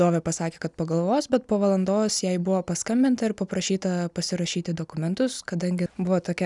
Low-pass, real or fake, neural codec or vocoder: 10.8 kHz; real; none